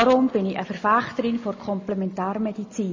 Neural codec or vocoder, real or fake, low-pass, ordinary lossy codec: none; real; 7.2 kHz; MP3, 32 kbps